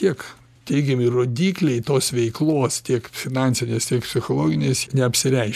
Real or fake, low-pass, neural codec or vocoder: real; 14.4 kHz; none